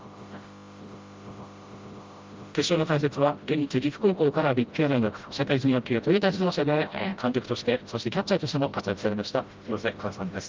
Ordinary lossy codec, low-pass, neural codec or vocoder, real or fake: Opus, 32 kbps; 7.2 kHz; codec, 16 kHz, 0.5 kbps, FreqCodec, smaller model; fake